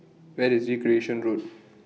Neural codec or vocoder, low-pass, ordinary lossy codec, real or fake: none; none; none; real